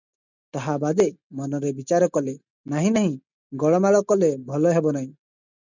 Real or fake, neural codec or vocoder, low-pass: real; none; 7.2 kHz